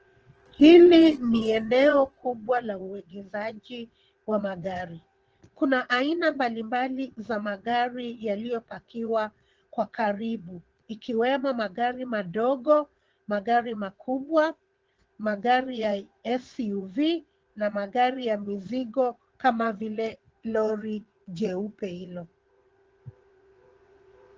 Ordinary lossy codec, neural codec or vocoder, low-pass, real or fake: Opus, 16 kbps; vocoder, 22.05 kHz, 80 mel bands, Vocos; 7.2 kHz; fake